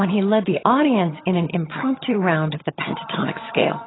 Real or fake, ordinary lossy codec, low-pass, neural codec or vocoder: fake; AAC, 16 kbps; 7.2 kHz; vocoder, 22.05 kHz, 80 mel bands, HiFi-GAN